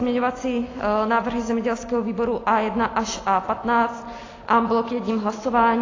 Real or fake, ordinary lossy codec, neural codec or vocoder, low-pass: real; AAC, 32 kbps; none; 7.2 kHz